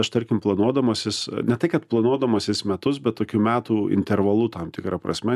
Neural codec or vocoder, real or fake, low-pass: none; real; 14.4 kHz